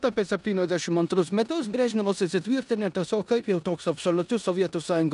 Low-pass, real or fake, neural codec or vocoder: 10.8 kHz; fake; codec, 16 kHz in and 24 kHz out, 0.9 kbps, LongCat-Audio-Codec, four codebook decoder